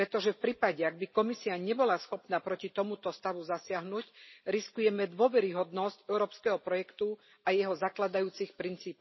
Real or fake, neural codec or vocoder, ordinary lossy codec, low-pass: real; none; MP3, 24 kbps; 7.2 kHz